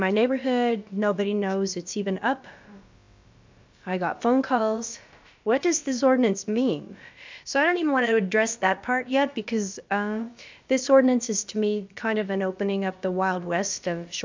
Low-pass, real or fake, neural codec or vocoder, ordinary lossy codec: 7.2 kHz; fake; codec, 16 kHz, about 1 kbps, DyCAST, with the encoder's durations; MP3, 64 kbps